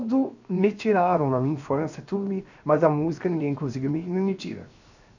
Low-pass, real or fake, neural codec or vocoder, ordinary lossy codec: 7.2 kHz; fake; codec, 16 kHz, 0.7 kbps, FocalCodec; none